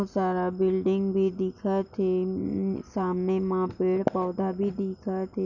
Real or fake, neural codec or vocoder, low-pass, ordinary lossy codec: real; none; 7.2 kHz; none